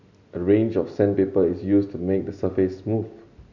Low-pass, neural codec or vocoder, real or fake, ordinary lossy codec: 7.2 kHz; none; real; none